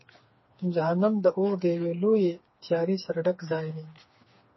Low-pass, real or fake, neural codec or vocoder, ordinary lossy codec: 7.2 kHz; fake; codec, 16 kHz, 4 kbps, FreqCodec, smaller model; MP3, 24 kbps